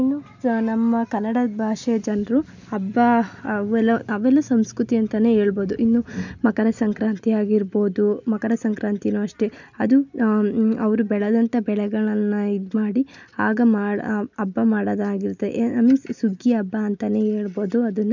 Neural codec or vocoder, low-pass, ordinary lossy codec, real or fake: none; 7.2 kHz; none; real